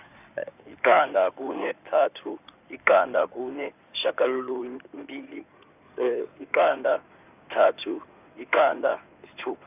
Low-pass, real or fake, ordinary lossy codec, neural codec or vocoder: 3.6 kHz; fake; none; codec, 16 kHz in and 24 kHz out, 2.2 kbps, FireRedTTS-2 codec